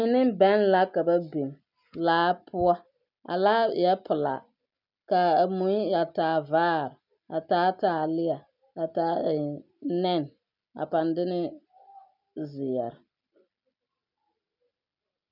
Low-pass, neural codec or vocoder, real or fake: 5.4 kHz; none; real